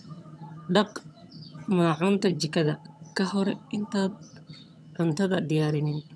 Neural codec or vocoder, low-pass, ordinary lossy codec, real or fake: vocoder, 22.05 kHz, 80 mel bands, HiFi-GAN; none; none; fake